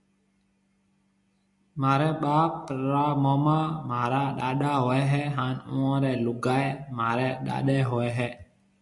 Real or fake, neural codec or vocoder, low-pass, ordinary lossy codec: real; none; 10.8 kHz; MP3, 96 kbps